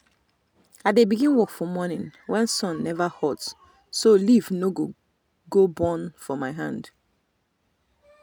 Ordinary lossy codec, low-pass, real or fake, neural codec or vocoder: none; none; real; none